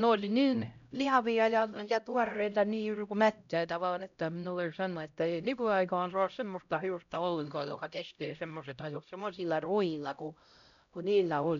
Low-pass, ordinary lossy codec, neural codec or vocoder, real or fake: 7.2 kHz; MP3, 96 kbps; codec, 16 kHz, 0.5 kbps, X-Codec, HuBERT features, trained on LibriSpeech; fake